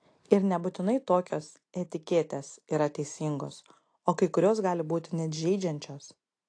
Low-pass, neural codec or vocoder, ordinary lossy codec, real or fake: 9.9 kHz; none; AAC, 48 kbps; real